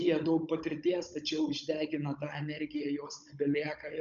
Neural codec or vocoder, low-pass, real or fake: codec, 16 kHz, 16 kbps, FunCodec, trained on Chinese and English, 50 frames a second; 7.2 kHz; fake